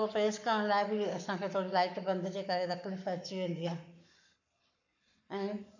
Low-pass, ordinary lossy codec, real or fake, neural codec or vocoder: 7.2 kHz; none; fake; codec, 44.1 kHz, 7.8 kbps, Pupu-Codec